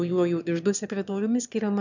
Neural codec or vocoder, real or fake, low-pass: autoencoder, 22.05 kHz, a latent of 192 numbers a frame, VITS, trained on one speaker; fake; 7.2 kHz